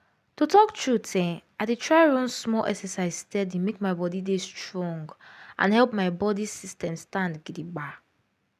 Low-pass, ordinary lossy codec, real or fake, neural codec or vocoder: 14.4 kHz; none; real; none